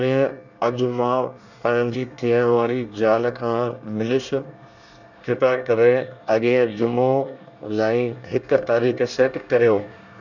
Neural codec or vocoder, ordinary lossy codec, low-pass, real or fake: codec, 24 kHz, 1 kbps, SNAC; none; 7.2 kHz; fake